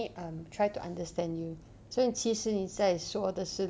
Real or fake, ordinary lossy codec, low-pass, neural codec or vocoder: real; none; none; none